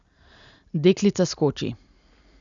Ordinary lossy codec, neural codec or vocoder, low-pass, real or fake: none; none; 7.2 kHz; real